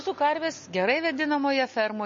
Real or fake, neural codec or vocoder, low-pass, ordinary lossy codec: real; none; 7.2 kHz; MP3, 32 kbps